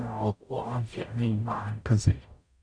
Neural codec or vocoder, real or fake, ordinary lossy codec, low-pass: codec, 44.1 kHz, 0.9 kbps, DAC; fake; none; 9.9 kHz